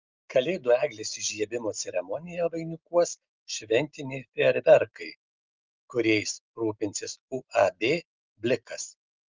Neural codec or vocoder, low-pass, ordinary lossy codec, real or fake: none; 7.2 kHz; Opus, 32 kbps; real